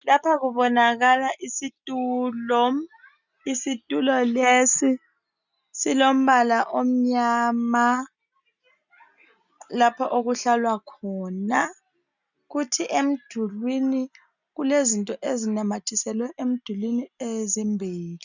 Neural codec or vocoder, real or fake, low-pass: none; real; 7.2 kHz